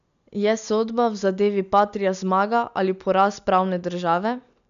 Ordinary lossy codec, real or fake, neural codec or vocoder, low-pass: none; real; none; 7.2 kHz